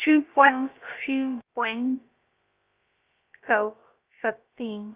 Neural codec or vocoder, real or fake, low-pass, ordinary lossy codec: codec, 16 kHz, about 1 kbps, DyCAST, with the encoder's durations; fake; 3.6 kHz; Opus, 24 kbps